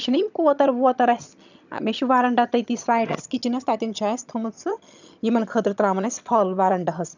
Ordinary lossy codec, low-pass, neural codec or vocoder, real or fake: none; 7.2 kHz; vocoder, 22.05 kHz, 80 mel bands, HiFi-GAN; fake